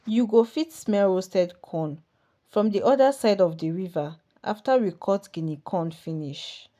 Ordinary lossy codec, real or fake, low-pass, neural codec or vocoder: none; real; 14.4 kHz; none